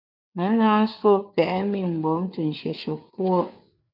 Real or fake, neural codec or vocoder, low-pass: fake; codec, 16 kHz, 4 kbps, FreqCodec, larger model; 5.4 kHz